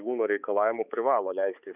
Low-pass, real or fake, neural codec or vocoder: 3.6 kHz; fake; codec, 16 kHz, 4 kbps, X-Codec, HuBERT features, trained on balanced general audio